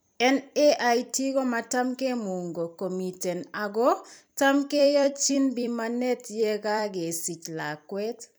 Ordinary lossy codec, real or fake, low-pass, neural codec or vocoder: none; fake; none; vocoder, 44.1 kHz, 128 mel bands every 256 samples, BigVGAN v2